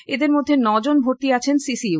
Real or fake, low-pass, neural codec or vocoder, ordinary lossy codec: real; none; none; none